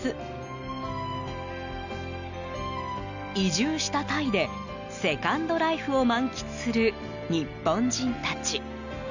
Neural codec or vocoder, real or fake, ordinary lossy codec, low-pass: none; real; none; 7.2 kHz